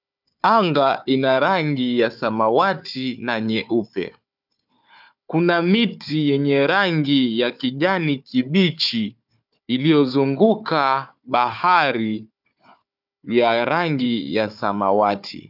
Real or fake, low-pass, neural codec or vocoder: fake; 5.4 kHz; codec, 16 kHz, 4 kbps, FunCodec, trained on Chinese and English, 50 frames a second